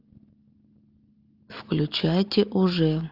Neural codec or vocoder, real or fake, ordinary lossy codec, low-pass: none; real; Opus, 32 kbps; 5.4 kHz